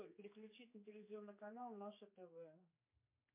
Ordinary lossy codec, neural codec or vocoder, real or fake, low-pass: MP3, 24 kbps; codec, 16 kHz, 4 kbps, X-Codec, HuBERT features, trained on general audio; fake; 3.6 kHz